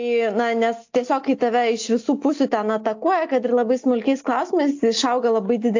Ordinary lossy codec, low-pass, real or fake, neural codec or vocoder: AAC, 48 kbps; 7.2 kHz; real; none